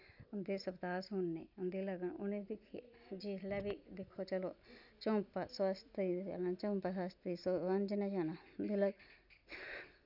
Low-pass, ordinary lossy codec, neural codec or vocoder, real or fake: 5.4 kHz; none; none; real